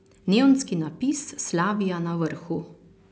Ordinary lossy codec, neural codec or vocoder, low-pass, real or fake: none; none; none; real